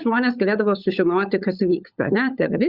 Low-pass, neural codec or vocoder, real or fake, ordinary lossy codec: 5.4 kHz; codec, 16 kHz, 16 kbps, FunCodec, trained on LibriTTS, 50 frames a second; fake; Opus, 64 kbps